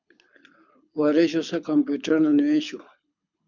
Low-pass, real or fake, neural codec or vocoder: 7.2 kHz; fake; codec, 24 kHz, 6 kbps, HILCodec